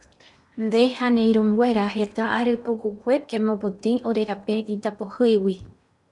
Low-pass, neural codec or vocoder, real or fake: 10.8 kHz; codec, 16 kHz in and 24 kHz out, 0.8 kbps, FocalCodec, streaming, 65536 codes; fake